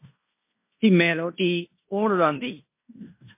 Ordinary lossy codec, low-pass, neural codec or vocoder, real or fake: MP3, 24 kbps; 3.6 kHz; codec, 16 kHz in and 24 kHz out, 0.9 kbps, LongCat-Audio-Codec, fine tuned four codebook decoder; fake